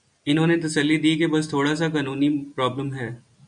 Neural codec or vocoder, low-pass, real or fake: none; 9.9 kHz; real